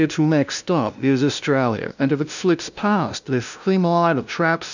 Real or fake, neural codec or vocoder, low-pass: fake; codec, 16 kHz, 0.5 kbps, FunCodec, trained on LibriTTS, 25 frames a second; 7.2 kHz